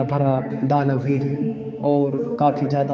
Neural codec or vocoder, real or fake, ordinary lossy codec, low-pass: codec, 16 kHz, 4 kbps, X-Codec, HuBERT features, trained on balanced general audio; fake; none; none